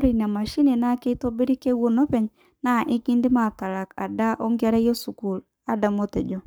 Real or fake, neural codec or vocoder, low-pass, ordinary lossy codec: fake; codec, 44.1 kHz, 7.8 kbps, Pupu-Codec; none; none